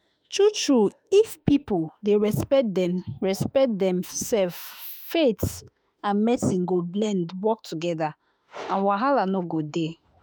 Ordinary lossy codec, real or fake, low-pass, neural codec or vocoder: none; fake; none; autoencoder, 48 kHz, 32 numbers a frame, DAC-VAE, trained on Japanese speech